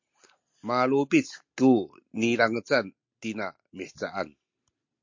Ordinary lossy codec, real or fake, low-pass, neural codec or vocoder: MP3, 48 kbps; real; 7.2 kHz; none